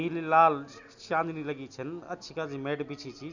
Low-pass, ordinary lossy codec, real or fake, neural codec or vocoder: 7.2 kHz; none; real; none